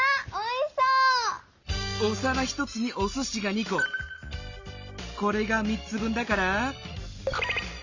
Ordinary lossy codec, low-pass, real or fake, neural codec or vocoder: Opus, 64 kbps; 7.2 kHz; real; none